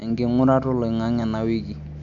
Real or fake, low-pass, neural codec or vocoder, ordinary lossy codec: real; 7.2 kHz; none; none